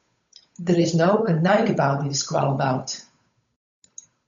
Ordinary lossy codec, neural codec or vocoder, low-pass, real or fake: MP3, 64 kbps; codec, 16 kHz, 8 kbps, FunCodec, trained on Chinese and English, 25 frames a second; 7.2 kHz; fake